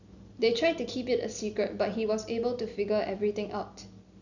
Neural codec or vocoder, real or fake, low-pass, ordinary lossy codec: none; real; 7.2 kHz; none